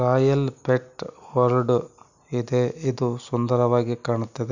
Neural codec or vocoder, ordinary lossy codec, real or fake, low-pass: none; none; real; 7.2 kHz